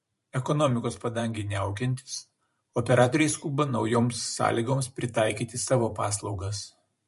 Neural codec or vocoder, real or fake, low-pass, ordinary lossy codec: vocoder, 44.1 kHz, 128 mel bands every 512 samples, BigVGAN v2; fake; 14.4 kHz; MP3, 48 kbps